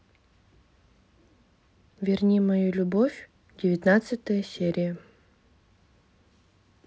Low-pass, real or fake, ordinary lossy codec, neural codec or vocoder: none; real; none; none